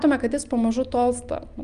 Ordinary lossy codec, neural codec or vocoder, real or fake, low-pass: Opus, 32 kbps; none; real; 9.9 kHz